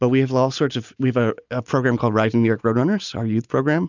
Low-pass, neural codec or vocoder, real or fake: 7.2 kHz; none; real